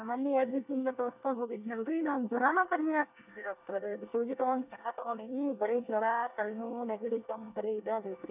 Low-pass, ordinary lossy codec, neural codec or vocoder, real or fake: 3.6 kHz; none; codec, 24 kHz, 1 kbps, SNAC; fake